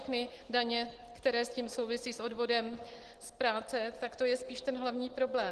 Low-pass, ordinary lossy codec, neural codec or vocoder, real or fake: 10.8 kHz; Opus, 16 kbps; none; real